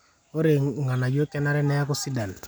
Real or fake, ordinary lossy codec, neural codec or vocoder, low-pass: real; none; none; none